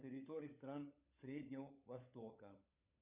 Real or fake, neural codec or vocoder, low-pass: fake; codec, 16 kHz, 16 kbps, FunCodec, trained on LibriTTS, 50 frames a second; 3.6 kHz